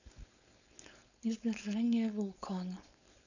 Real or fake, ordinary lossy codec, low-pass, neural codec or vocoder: fake; none; 7.2 kHz; codec, 16 kHz, 4.8 kbps, FACodec